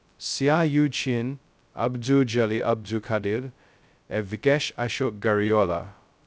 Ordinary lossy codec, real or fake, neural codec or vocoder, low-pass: none; fake; codec, 16 kHz, 0.2 kbps, FocalCodec; none